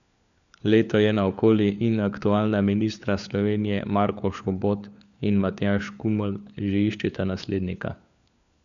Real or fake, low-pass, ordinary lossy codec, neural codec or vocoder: fake; 7.2 kHz; none; codec, 16 kHz, 4 kbps, FunCodec, trained on LibriTTS, 50 frames a second